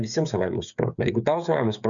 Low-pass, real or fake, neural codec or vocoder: 7.2 kHz; fake; codec, 16 kHz, 8 kbps, FreqCodec, smaller model